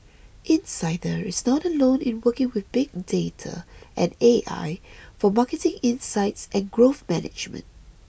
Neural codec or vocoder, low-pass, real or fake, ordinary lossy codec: none; none; real; none